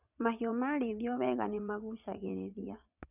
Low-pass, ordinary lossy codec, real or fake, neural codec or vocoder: 3.6 kHz; none; fake; vocoder, 22.05 kHz, 80 mel bands, WaveNeXt